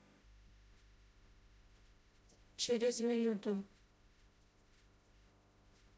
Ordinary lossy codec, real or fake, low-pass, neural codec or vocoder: none; fake; none; codec, 16 kHz, 0.5 kbps, FreqCodec, smaller model